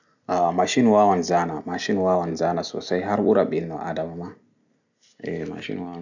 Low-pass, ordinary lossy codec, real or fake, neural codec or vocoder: 7.2 kHz; none; real; none